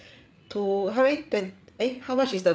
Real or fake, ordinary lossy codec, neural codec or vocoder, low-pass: fake; none; codec, 16 kHz, 8 kbps, FreqCodec, larger model; none